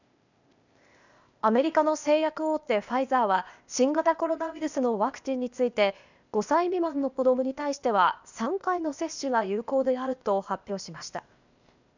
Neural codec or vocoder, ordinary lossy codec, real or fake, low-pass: codec, 16 kHz, 0.8 kbps, ZipCodec; none; fake; 7.2 kHz